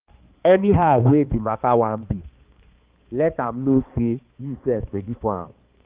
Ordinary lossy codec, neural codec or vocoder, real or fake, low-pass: Opus, 32 kbps; codec, 16 kHz, 2 kbps, X-Codec, HuBERT features, trained on balanced general audio; fake; 3.6 kHz